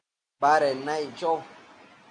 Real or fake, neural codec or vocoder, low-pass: real; none; 9.9 kHz